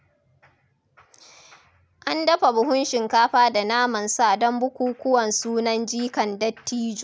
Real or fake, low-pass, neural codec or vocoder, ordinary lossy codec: real; none; none; none